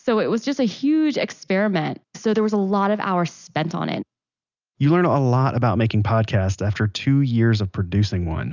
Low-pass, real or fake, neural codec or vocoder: 7.2 kHz; real; none